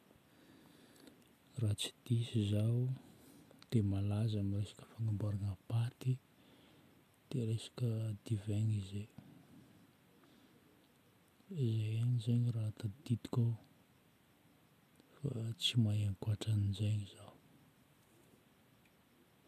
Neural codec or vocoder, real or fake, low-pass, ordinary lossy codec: none; real; 14.4 kHz; none